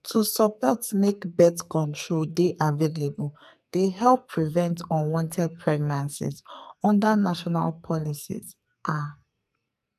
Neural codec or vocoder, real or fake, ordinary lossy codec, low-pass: codec, 44.1 kHz, 2.6 kbps, SNAC; fake; none; 14.4 kHz